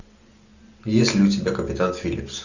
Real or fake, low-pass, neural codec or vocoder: real; 7.2 kHz; none